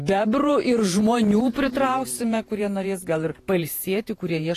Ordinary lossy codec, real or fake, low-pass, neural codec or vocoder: AAC, 48 kbps; fake; 14.4 kHz; vocoder, 48 kHz, 128 mel bands, Vocos